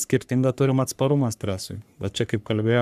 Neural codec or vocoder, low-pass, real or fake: codec, 44.1 kHz, 3.4 kbps, Pupu-Codec; 14.4 kHz; fake